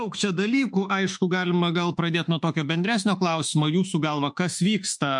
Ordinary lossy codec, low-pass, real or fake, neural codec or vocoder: MP3, 64 kbps; 10.8 kHz; fake; autoencoder, 48 kHz, 32 numbers a frame, DAC-VAE, trained on Japanese speech